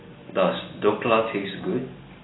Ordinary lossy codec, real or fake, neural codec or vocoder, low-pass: AAC, 16 kbps; real; none; 7.2 kHz